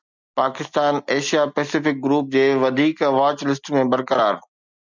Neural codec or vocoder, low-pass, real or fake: none; 7.2 kHz; real